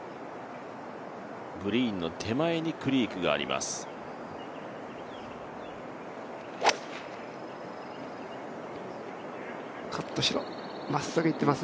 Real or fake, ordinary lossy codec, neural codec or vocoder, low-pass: real; none; none; none